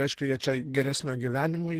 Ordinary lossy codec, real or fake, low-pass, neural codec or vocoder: Opus, 16 kbps; fake; 14.4 kHz; codec, 44.1 kHz, 2.6 kbps, SNAC